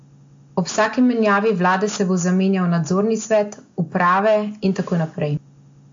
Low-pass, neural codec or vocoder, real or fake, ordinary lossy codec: 7.2 kHz; none; real; AAC, 48 kbps